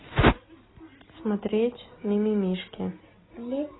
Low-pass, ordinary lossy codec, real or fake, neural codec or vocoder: 7.2 kHz; AAC, 16 kbps; real; none